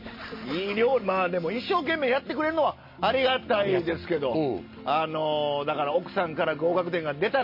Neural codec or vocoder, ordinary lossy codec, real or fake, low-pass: none; MP3, 24 kbps; real; 5.4 kHz